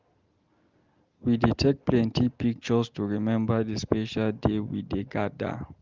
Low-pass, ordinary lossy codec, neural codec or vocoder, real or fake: 7.2 kHz; Opus, 32 kbps; none; real